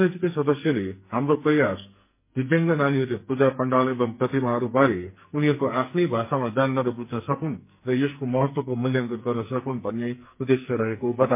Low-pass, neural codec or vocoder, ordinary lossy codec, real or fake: 3.6 kHz; codec, 44.1 kHz, 2.6 kbps, SNAC; MP3, 24 kbps; fake